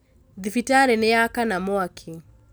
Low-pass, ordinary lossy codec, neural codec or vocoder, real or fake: none; none; none; real